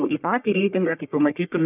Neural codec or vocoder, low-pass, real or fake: codec, 44.1 kHz, 1.7 kbps, Pupu-Codec; 3.6 kHz; fake